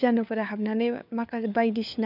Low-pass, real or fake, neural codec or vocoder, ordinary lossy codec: 5.4 kHz; fake; codec, 16 kHz, 4 kbps, FunCodec, trained on LibriTTS, 50 frames a second; none